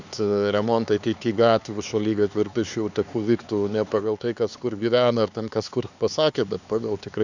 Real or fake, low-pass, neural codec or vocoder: fake; 7.2 kHz; codec, 16 kHz, 4 kbps, X-Codec, HuBERT features, trained on LibriSpeech